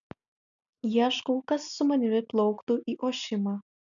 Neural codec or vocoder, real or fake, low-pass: none; real; 7.2 kHz